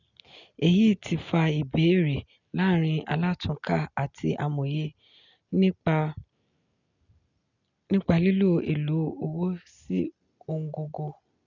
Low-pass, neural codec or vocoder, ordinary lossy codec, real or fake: 7.2 kHz; none; none; real